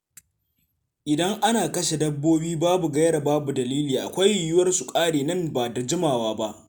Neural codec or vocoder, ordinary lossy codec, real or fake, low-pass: none; none; real; none